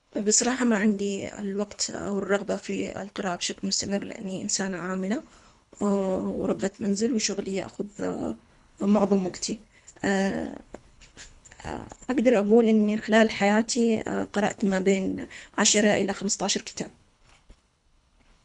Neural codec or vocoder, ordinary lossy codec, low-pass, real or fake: codec, 24 kHz, 3 kbps, HILCodec; none; 10.8 kHz; fake